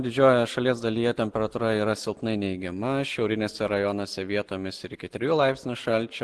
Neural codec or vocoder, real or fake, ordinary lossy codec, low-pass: none; real; Opus, 16 kbps; 10.8 kHz